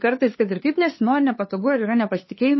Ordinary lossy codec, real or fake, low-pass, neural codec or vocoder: MP3, 24 kbps; fake; 7.2 kHz; codec, 16 kHz, 2 kbps, FunCodec, trained on LibriTTS, 25 frames a second